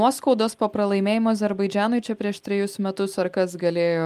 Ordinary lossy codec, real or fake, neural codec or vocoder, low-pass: Opus, 32 kbps; real; none; 14.4 kHz